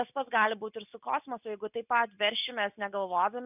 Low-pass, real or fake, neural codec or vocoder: 3.6 kHz; real; none